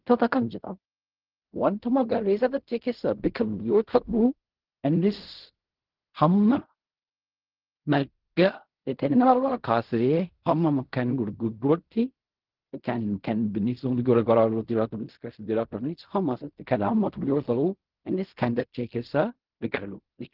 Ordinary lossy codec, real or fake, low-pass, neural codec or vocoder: Opus, 16 kbps; fake; 5.4 kHz; codec, 16 kHz in and 24 kHz out, 0.4 kbps, LongCat-Audio-Codec, fine tuned four codebook decoder